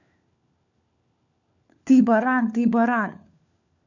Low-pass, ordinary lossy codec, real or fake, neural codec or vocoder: 7.2 kHz; none; fake; codec, 16 kHz, 4 kbps, FunCodec, trained on LibriTTS, 50 frames a second